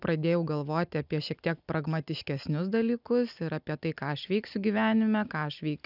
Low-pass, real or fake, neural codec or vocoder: 5.4 kHz; real; none